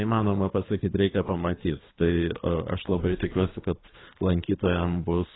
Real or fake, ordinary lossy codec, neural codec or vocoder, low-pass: fake; AAC, 16 kbps; codec, 24 kHz, 3 kbps, HILCodec; 7.2 kHz